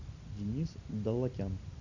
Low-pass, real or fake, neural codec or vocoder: 7.2 kHz; real; none